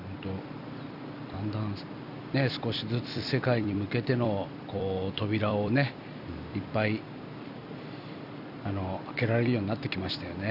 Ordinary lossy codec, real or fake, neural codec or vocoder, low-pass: none; fake; vocoder, 44.1 kHz, 128 mel bands every 256 samples, BigVGAN v2; 5.4 kHz